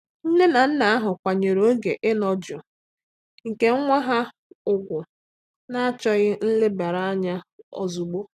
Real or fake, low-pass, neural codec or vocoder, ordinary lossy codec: real; 14.4 kHz; none; none